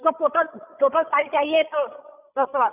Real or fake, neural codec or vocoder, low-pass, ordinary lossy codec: fake; codec, 16 kHz, 16 kbps, FreqCodec, larger model; 3.6 kHz; none